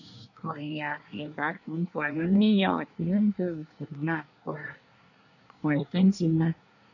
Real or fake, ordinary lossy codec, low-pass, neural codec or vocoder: fake; none; 7.2 kHz; codec, 24 kHz, 1 kbps, SNAC